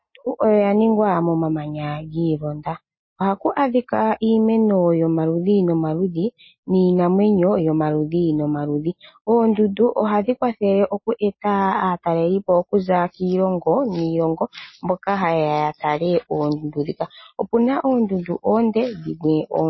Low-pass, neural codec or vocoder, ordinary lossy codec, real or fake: 7.2 kHz; none; MP3, 24 kbps; real